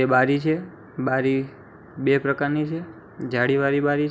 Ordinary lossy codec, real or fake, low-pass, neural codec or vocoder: none; real; none; none